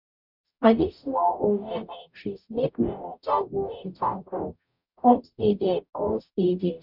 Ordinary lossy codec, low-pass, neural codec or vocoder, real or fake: none; 5.4 kHz; codec, 44.1 kHz, 0.9 kbps, DAC; fake